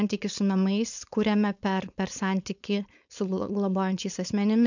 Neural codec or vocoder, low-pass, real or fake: codec, 16 kHz, 4.8 kbps, FACodec; 7.2 kHz; fake